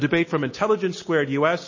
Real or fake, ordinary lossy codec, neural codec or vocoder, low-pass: real; MP3, 32 kbps; none; 7.2 kHz